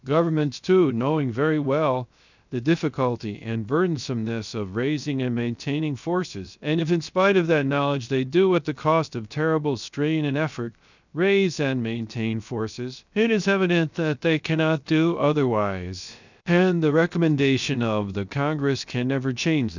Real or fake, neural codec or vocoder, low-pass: fake; codec, 16 kHz, 0.7 kbps, FocalCodec; 7.2 kHz